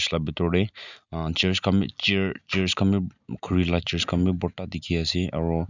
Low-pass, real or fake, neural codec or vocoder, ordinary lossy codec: 7.2 kHz; real; none; none